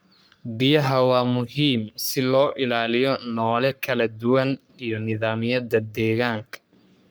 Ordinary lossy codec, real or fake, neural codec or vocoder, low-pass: none; fake; codec, 44.1 kHz, 3.4 kbps, Pupu-Codec; none